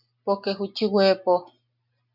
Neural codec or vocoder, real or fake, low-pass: none; real; 5.4 kHz